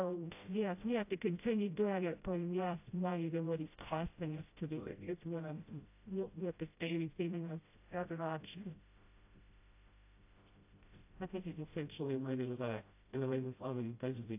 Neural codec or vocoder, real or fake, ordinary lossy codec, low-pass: codec, 16 kHz, 0.5 kbps, FreqCodec, smaller model; fake; AAC, 32 kbps; 3.6 kHz